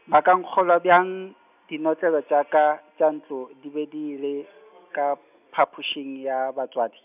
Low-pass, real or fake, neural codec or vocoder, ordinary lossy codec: 3.6 kHz; real; none; none